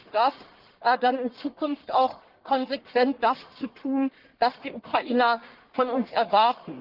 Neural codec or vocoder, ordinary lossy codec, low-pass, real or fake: codec, 44.1 kHz, 1.7 kbps, Pupu-Codec; Opus, 16 kbps; 5.4 kHz; fake